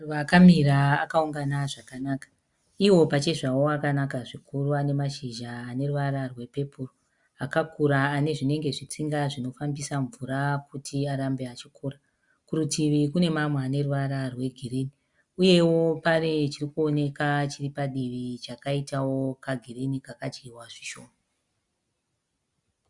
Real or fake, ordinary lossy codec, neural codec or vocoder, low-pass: real; Opus, 64 kbps; none; 10.8 kHz